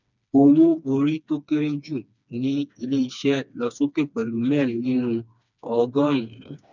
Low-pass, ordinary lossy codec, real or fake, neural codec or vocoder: 7.2 kHz; none; fake; codec, 16 kHz, 2 kbps, FreqCodec, smaller model